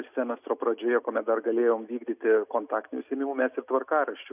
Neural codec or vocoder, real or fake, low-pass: none; real; 3.6 kHz